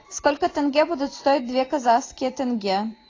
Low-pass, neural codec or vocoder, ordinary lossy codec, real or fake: 7.2 kHz; vocoder, 44.1 kHz, 128 mel bands every 256 samples, BigVGAN v2; AAC, 32 kbps; fake